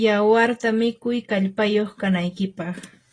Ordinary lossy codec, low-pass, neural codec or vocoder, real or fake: AAC, 32 kbps; 9.9 kHz; none; real